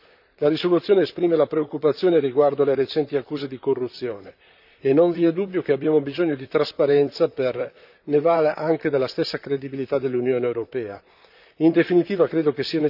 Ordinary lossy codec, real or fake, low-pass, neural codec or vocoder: none; fake; 5.4 kHz; vocoder, 44.1 kHz, 128 mel bands, Pupu-Vocoder